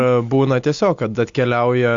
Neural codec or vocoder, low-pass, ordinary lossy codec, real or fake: none; 7.2 kHz; AAC, 64 kbps; real